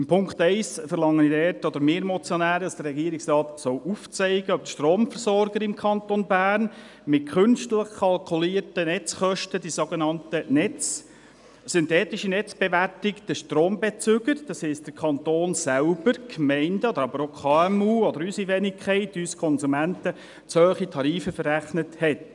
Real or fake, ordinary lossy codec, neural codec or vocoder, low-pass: real; none; none; 10.8 kHz